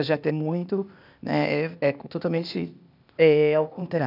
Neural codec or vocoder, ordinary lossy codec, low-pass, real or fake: codec, 16 kHz, 0.8 kbps, ZipCodec; none; 5.4 kHz; fake